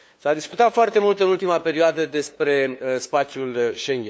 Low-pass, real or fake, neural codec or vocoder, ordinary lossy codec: none; fake; codec, 16 kHz, 2 kbps, FunCodec, trained on LibriTTS, 25 frames a second; none